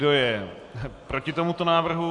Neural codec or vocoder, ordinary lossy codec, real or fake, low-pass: none; AAC, 64 kbps; real; 10.8 kHz